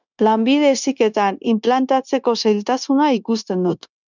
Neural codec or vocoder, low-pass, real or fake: codec, 16 kHz, 0.9 kbps, LongCat-Audio-Codec; 7.2 kHz; fake